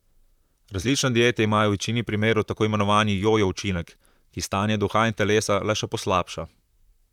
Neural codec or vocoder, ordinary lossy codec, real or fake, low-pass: vocoder, 44.1 kHz, 128 mel bands, Pupu-Vocoder; none; fake; 19.8 kHz